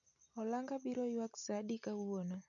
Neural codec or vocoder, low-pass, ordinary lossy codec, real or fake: none; 7.2 kHz; none; real